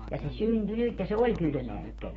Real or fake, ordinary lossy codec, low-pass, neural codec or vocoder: fake; AAC, 24 kbps; 19.8 kHz; autoencoder, 48 kHz, 128 numbers a frame, DAC-VAE, trained on Japanese speech